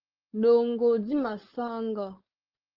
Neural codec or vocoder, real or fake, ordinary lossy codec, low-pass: codec, 16 kHz, 4.8 kbps, FACodec; fake; Opus, 16 kbps; 5.4 kHz